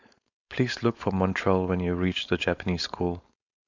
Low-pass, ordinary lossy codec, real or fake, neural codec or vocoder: 7.2 kHz; MP3, 64 kbps; fake; codec, 16 kHz, 4.8 kbps, FACodec